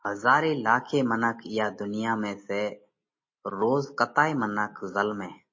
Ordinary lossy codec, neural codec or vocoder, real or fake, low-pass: MP3, 32 kbps; none; real; 7.2 kHz